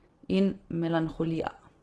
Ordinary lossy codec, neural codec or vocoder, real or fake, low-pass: Opus, 16 kbps; none; real; 10.8 kHz